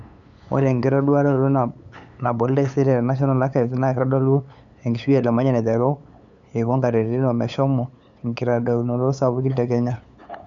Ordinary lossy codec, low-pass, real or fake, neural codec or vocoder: none; 7.2 kHz; fake; codec, 16 kHz, 8 kbps, FunCodec, trained on LibriTTS, 25 frames a second